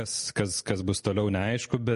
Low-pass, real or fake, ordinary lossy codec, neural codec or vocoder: 14.4 kHz; real; MP3, 48 kbps; none